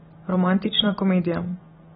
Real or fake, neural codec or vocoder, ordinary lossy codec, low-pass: real; none; AAC, 16 kbps; 19.8 kHz